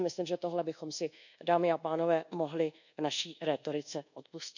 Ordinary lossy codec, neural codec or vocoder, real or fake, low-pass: none; codec, 24 kHz, 1.2 kbps, DualCodec; fake; 7.2 kHz